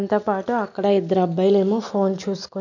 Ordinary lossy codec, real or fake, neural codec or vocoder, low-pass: none; real; none; 7.2 kHz